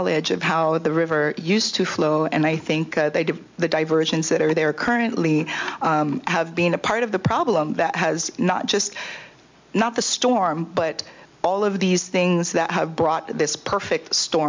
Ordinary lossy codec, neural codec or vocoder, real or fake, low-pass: MP3, 64 kbps; none; real; 7.2 kHz